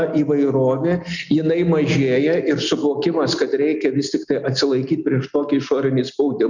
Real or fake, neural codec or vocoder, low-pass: real; none; 7.2 kHz